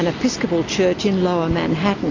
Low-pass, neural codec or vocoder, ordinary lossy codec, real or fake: 7.2 kHz; none; AAC, 32 kbps; real